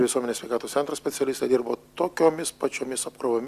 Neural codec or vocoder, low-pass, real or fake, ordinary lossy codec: none; 14.4 kHz; real; Opus, 64 kbps